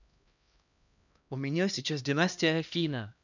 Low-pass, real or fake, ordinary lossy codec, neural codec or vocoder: 7.2 kHz; fake; none; codec, 16 kHz, 1 kbps, X-Codec, HuBERT features, trained on LibriSpeech